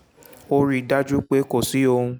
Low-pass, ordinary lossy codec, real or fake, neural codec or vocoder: none; none; real; none